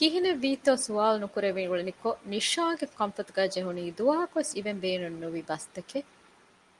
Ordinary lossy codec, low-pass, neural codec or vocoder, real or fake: Opus, 32 kbps; 10.8 kHz; none; real